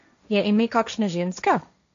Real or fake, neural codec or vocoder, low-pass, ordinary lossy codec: fake; codec, 16 kHz, 1.1 kbps, Voila-Tokenizer; 7.2 kHz; MP3, 64 kbps